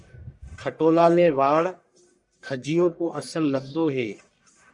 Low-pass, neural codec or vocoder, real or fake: 10.8 kHz; codec, 44.1 kHz, 1.7 kbps, Pupu-Codec; fake